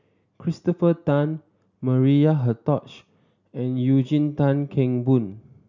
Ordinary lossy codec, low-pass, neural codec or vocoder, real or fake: none; 7.2 kHz; none; real